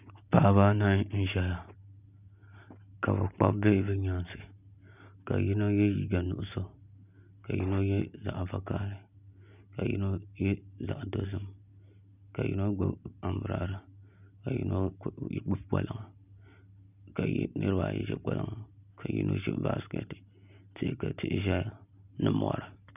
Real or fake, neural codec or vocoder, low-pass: real; none; 3.6 kHz